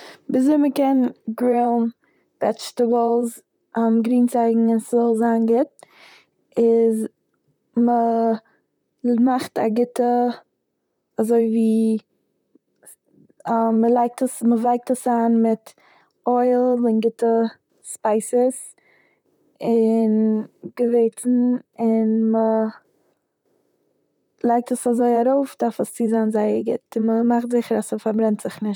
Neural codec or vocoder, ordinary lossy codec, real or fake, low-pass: vocoder, 44.1 kHz, 128 mel bands, Pupu-Vocoder; none; fake; 19.8 kHz